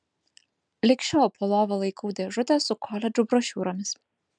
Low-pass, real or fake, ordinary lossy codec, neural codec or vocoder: 9.9 kHz; real; MP3, 96 kbps; none